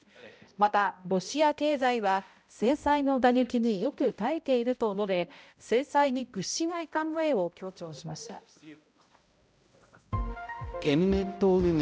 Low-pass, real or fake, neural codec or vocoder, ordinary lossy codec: none; fake; codec, 16 kHz, 0.5 kbps, X-Codec, HuBERT features, trained on balanced general audio; none